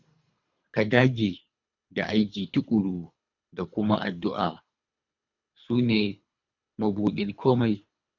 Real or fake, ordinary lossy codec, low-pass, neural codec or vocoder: fake; none; 7.2 kHz; codec, 24 kHz, 3 kbps, HILCodec